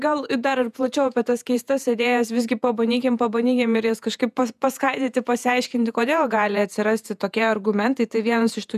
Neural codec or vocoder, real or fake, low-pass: vocoder, 48 kHz, 128 mel bands, Vocos; fake; 14.4 kHz